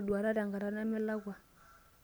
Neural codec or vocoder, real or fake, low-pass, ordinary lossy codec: none; real; none; none